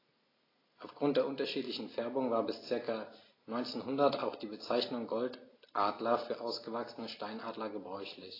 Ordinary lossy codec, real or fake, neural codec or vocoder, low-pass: AAC, 24 kbps; real; none; 5.4 kHz